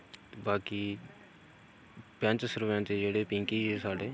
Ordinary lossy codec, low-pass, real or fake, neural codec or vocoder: none; none; real; none